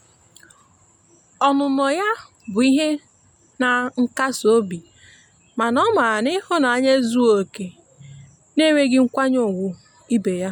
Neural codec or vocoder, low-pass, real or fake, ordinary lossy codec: none; 19.8 kHz; real; MP3, 96 kbps